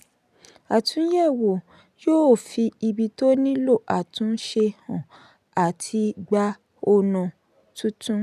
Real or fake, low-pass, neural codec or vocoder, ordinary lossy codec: real; 14.4 kHz; none; none